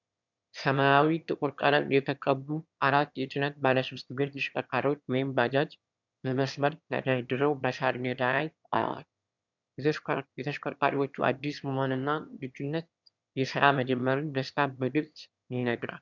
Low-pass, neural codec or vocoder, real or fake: 7.2 kHz; autoencoder, 22.05 kHz, a latent of 192 numbers a frame, VITS, trained on one speaker; fake